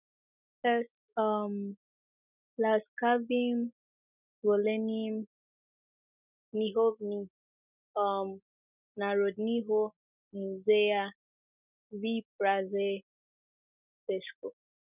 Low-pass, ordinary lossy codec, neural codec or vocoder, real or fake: 3.6 kHz; none; none; real